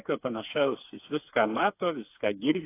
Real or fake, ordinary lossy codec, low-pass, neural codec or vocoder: fake; AAC, 24 kbps; 3.6 kHz; codec, 16 kHz, 4 kbps, FreqCodec, smaller model